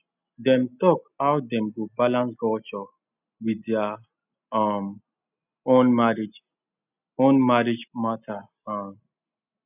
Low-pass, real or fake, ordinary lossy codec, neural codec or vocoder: 3.6 kHz; real; none; none